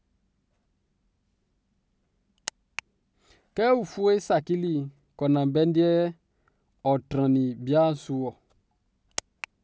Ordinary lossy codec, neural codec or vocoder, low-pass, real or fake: none; none; none; real